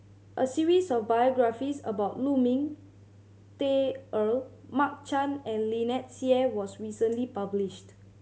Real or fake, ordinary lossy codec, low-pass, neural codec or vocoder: real; none; none; none